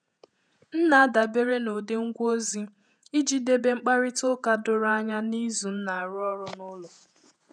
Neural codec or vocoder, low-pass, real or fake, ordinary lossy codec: vocoder, 48 kHz, 128 mel bands, Vocos; 9.9 kHz; fake; none